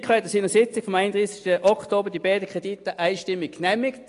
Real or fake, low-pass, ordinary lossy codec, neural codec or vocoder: real; 10.8 kHz; AAC, 48 kbps; none